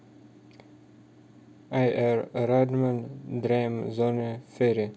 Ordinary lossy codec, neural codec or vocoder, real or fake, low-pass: none; none; real; none